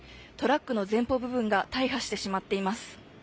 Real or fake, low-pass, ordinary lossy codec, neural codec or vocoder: real; none; none; none